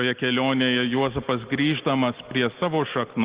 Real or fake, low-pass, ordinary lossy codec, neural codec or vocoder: real; 3.6 kHz; Opus, 16 kbps; none